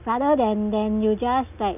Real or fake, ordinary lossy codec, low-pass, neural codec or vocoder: real; none; 3.6 kHz; none